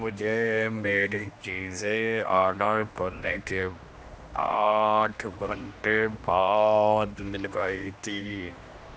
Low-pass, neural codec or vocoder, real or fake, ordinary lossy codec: none; codec, 16 kHz, 1 kbps, X-Codec, HuBERT features, trained on general audio; fake; none